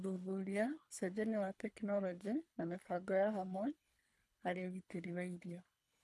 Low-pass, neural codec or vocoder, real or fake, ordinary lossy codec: none; codec, 24 kHz, 3 kbps, HILCodec; fake; none